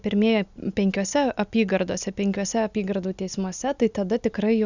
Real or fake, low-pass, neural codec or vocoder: real; 7.2 kHz; none